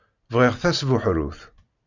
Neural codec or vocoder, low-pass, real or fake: none; 7.2 kHz; real